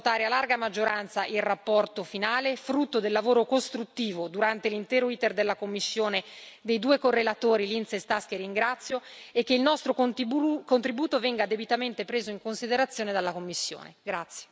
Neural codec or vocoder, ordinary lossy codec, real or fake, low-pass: none; none; real; none